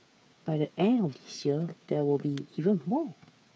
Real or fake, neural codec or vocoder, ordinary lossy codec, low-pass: fake; codec, 16 kHz, 8 kbps, FreqCodec, smaller model; none; none